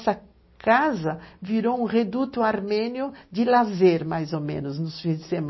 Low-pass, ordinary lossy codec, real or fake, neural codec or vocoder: 7.2 kHz; MP3, 24 kbps; real; none